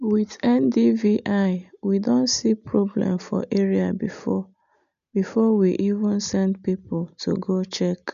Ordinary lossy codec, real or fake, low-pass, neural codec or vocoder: none; real; 7.2 kHz; none